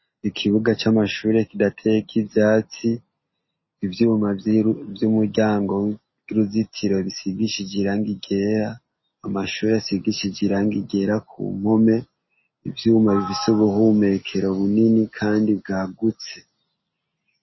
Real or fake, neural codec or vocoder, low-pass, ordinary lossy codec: real; none; 7.2 kHz; MP3, 24 kbps